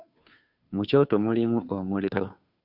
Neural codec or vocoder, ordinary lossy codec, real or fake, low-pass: codec, 16 kHz, 2 kbps, FunCodec, trained on Chinese and English, 25 frames a second; Opus, 64 kbps; fake; 5.4 kHz